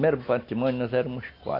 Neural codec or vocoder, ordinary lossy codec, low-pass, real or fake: none; AAC, 32 kbps; 5.4 kHz; real